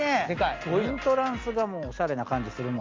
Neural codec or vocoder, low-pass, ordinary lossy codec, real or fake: none; 7.2 kHz; Opus, 32 kbps; real